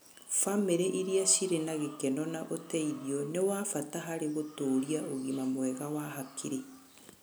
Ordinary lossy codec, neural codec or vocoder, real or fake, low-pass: none; none; real; none